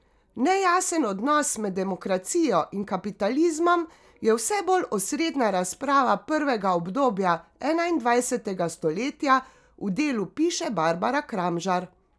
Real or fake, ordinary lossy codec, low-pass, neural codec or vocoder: real; none; none; none